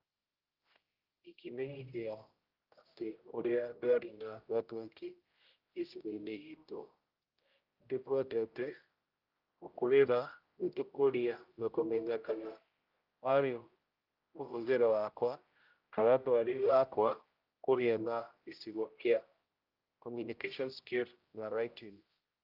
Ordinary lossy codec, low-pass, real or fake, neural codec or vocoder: Opus, 32 kbps; 5.4 kHz; fake; codec, 16 kHz, 0.5 kbps, X-Codec, HuBERT features, trained on general audio